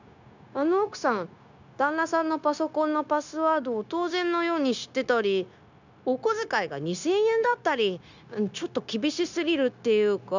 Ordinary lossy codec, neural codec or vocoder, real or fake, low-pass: none; codec, 16 kHz, 0.9 kbps, LongCat-Audio-Codec; fake; 7.2 kHz